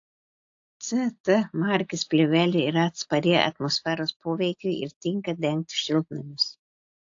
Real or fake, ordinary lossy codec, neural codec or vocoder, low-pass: real; AAC, 48 kbps; none; 7.2 kHz